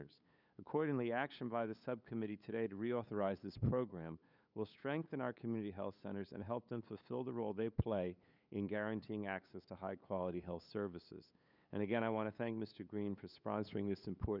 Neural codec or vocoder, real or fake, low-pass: codec, 16 kHz, 8 kbps, FunCodec, trained on LibriTTS, 25 frames a second; fake; 5.4 kHz